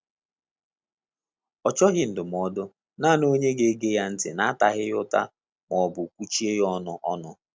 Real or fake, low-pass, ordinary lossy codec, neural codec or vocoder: real; none; none; none